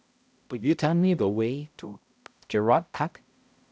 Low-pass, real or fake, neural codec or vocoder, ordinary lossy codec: none; fake; codec, 16 kHz, 0.5 kbps, X-Codec, HuBERT features, trained on balanced general audio; none